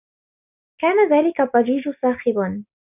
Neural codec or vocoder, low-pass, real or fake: none; 3.6 kHz; real